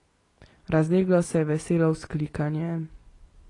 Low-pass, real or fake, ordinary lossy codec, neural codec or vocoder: 10.8 kHz; real; AAC, 32 kbps; none